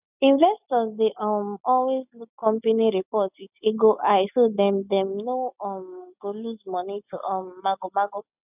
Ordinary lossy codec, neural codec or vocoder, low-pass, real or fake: none; none; 3.6 kHz; real